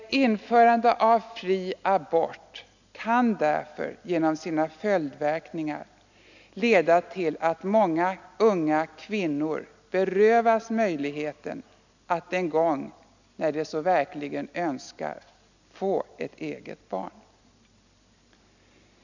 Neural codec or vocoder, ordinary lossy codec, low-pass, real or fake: none; none; 7.2 kHz; real